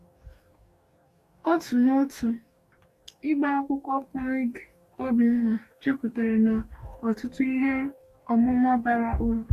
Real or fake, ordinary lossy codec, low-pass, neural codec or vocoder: fake; AAC, 64 kbps; 14.4 kHz; codec, 44.1 kHz, 2.6 kbps, DAC